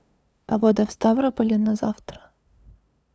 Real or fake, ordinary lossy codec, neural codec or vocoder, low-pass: fake; none; codec, 16 kHz, 2 kbps, FunCodec, trained on LibriTTS, 25 frames a second; none